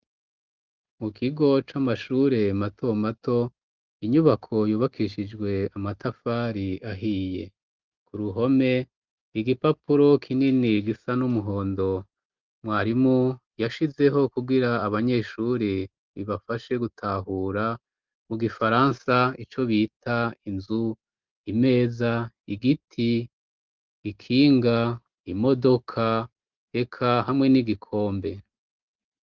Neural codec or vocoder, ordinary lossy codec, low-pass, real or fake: none; Opus, 24 kbps; 7.2 kHz; real